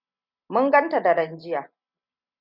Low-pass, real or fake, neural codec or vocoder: 5.4 kHz; real; none